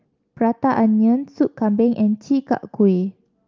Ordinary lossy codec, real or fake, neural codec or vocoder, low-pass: Opus, 32 kbps; real; none; 7.2 kHz